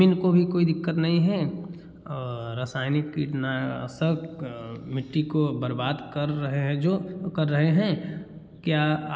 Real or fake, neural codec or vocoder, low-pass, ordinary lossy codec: real; none; none; none